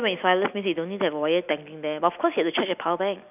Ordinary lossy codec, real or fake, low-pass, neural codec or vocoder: none; real; 3.6 kHz; none